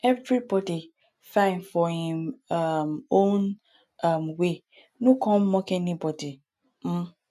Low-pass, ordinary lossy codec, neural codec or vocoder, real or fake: 14.4 kHz; none; none; real